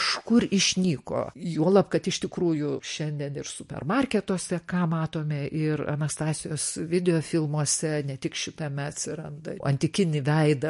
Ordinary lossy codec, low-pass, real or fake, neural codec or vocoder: MP3, 48 kbps; 14.4 kHz; real; none